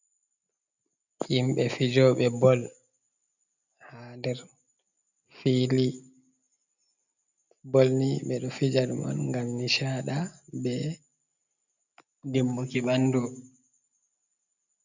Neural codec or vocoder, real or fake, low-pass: none; real; 7.2 kHz